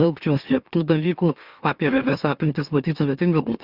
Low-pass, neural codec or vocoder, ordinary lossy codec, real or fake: 5.4 kHz; autoencoder, 44.1 kHz, a latent of 192 numbers a frame, MeloTTS; Opus, 64 kbps; fake